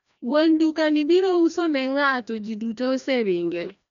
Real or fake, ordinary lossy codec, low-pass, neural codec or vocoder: fake; none; 7.2 kHz; codec, 16 kHz, 1 kbps, FreqCodec, larger model